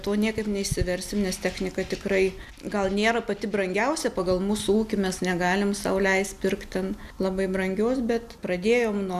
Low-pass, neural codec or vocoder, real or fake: 14.4 kHz; none; real